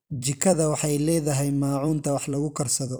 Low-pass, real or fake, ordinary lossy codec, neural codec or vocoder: none; fake; none; vocoder, 44.1 kHz, 128 mel bands every 256 samples, BigVGAN v2